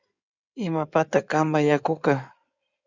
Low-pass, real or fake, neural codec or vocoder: 7.2 kHz; fake; codec, 16 kHz in and 24 kHz out, 2.2 kbps, FireRedTTS-2 codec